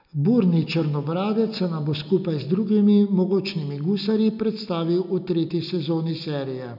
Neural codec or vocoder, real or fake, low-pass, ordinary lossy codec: none; real; 5.4 kHz; none